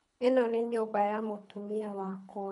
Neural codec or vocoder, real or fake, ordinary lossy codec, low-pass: codec, 24 kHz, 3 kbps, HILCodec; fake; none; 10.8 kHz